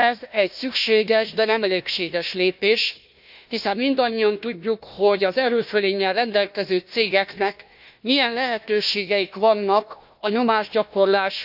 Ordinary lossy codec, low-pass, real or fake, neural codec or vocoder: AAC, 48 kbps; 5.4 kHz; fake; codec, 16 kHz, 1 kbps, FunCodec, trained on Chinese and English, 50 frames a second